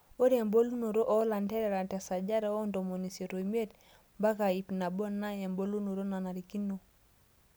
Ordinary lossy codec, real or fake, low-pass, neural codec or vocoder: none; real; none; none